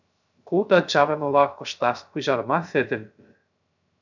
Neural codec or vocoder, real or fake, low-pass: codec, 16 kHz, 0.3 kbps, FocalCodec; fake; 7.2 kHz